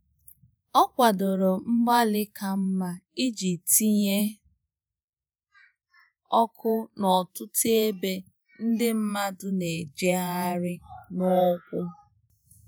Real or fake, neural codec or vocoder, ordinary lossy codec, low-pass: fake; vocoder, 48 kHz, 128 mel bands, Vocos; none; none